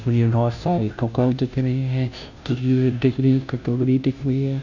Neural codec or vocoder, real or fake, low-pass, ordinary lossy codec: codec, 16 kHz, 0.5 kbps, FunCodec, trained on Chinese and English, 25 frames a second; fake; 7.2 kHz; none